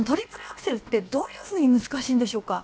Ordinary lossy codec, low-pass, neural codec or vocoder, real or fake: none; none; codec, 16 kHz, about 1 kbps, DyCAST, with the encoder's durations; fake